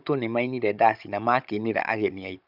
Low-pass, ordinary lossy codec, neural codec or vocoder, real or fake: 5.4 kHz; none; codec, 16 kHz, 16 kbps, FunCodec, trained on Chinese and English, 50 frames a second; fake